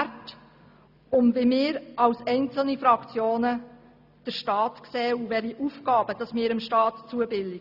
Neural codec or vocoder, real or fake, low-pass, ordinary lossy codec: none; real; 5.4 kHz; none